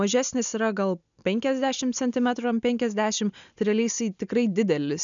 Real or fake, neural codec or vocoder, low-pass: real; none; 7.2 kHz